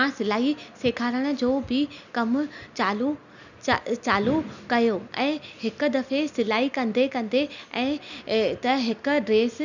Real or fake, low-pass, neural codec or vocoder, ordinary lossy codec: real; 7.2 kHz; none; none